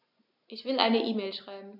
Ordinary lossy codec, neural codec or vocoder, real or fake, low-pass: none; none; real; 5.4 kHz